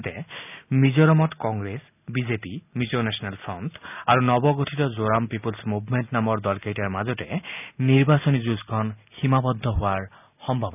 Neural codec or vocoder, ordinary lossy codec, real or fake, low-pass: none; none; real; 3.6 kHz